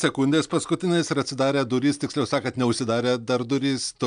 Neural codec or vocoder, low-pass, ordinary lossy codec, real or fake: none; 9.9 kHz; MP3, 96 kbps; real